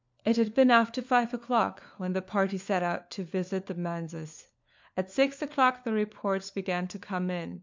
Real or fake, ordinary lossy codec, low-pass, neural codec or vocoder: fake; MP3, 64 kbps; 7.2 kHz; codec, 16 kHz, 4 kbps, FunCodec, trained on LibriTTS, 50 frames a second